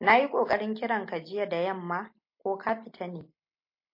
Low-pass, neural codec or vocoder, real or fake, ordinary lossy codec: 5.4 kHz; none; real; MP3, 32 kbps